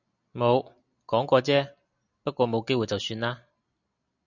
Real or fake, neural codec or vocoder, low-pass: real; none; 7.2 kHz